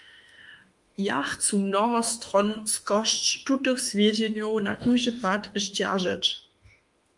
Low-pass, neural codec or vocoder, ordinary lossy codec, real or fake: 10.8 kHz; autoencoder, 48 kHz, 32 numbers a frame, DAC-VAE, trained on Japanese speech; Opus, 32 kbps; fake